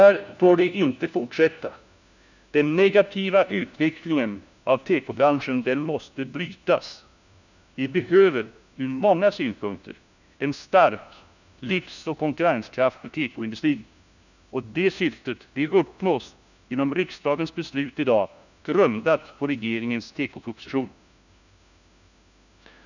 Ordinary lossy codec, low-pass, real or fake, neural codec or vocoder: none; 7.2 kHz; fake; codec, 16 kHz, 1 kbps, FunCodec, trained on LibriTTS, 50 frames a second